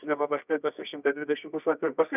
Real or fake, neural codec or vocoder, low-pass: fake; codec, 24 kHz, 0.9 kbps, WavTokenizer, medium music audio release; 3.6 kHz